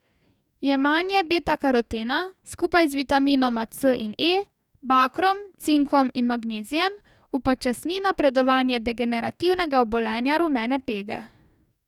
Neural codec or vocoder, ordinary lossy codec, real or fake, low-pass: codec, 44.1 kHz, 2.6 kbps, DAC; none; fake; 19.8 kHz